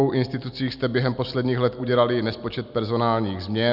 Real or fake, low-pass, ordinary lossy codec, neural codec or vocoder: real; 5.4 kHz; Opus, 64 kbps; none